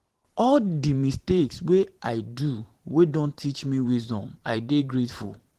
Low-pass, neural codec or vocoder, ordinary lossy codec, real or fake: 14.4 kHz; vocoder, 44.1 kHz, 128 mel bands every 512 samples, BigVGAN v2; Opus, 16 kbps; fake